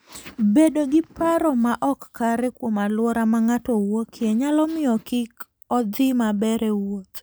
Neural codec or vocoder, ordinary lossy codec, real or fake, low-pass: none; none; real; none